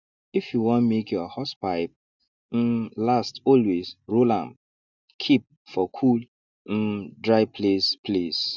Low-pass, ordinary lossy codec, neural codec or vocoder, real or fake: 7.2 kHz; none; none; real